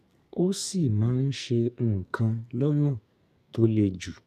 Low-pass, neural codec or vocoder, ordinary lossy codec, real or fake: 14.4 kHz; codec, 44.1 kHz, 2.6 kbps, SNAC; none; fake